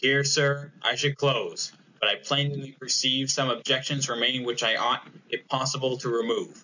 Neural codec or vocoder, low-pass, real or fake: none; 7.2 kHz; real